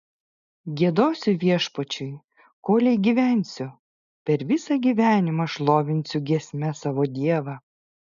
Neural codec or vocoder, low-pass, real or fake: none; 7.2 kHz; real